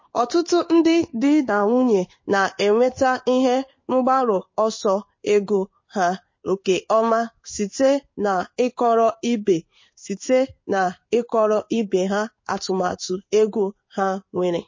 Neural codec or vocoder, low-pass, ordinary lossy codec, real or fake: codec, 16 kHz in and 24 kHz out, 1 kbps, XY-Tokenizer; 7.2 kHz; MP3, 32 kbps; fake